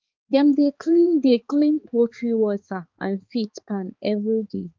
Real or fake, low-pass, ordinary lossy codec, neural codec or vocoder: fake; 7.2 kHz; Opus, 24 kbps; codec, 16 kHz, 4 kbps, X-Codec, HuBERT features, trained on balanced general audio